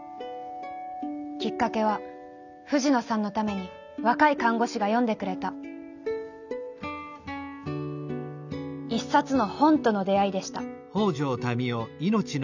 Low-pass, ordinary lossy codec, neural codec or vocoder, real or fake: 7.2 kHz; none; none; real